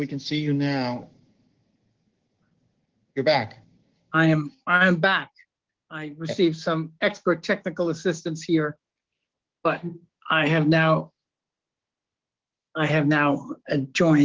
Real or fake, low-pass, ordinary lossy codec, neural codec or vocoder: fake; 7.2 kHz; Opus, 16 kbps; codec, 16 kHz, 6 kbps, DAC